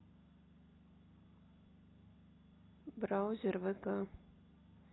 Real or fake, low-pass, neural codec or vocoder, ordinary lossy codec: real; 7.2 kHz; none; AAC, 16 kbps